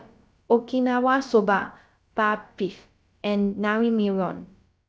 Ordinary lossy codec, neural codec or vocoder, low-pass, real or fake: none; codec, 16 kHz, about 1 kbps, DyCAST, with the encoder's durations; none; fake